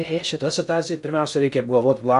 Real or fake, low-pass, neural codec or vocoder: fake; 10.8 kHz; codec, 16 kHz in and 24 kHz out, 0.6 kbps, FocalCodec, streaming, 2048 codes